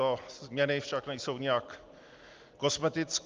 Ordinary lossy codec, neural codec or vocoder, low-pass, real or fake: Opus, 32 kbps; none; 7.2 kHz; real